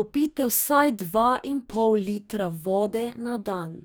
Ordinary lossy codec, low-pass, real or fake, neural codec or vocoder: none; none; fake; codec, 44.1 kHz, 2.6 kbps, DAC